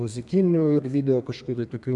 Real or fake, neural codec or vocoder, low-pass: fake; codec, 32 kHz, 1.9 kbps, SNAC; 10.8 kHz